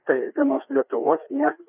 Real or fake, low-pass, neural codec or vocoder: fake; 3.6 kHz; codec, 16 kHz, 2 kbps, FreqCodec, larger model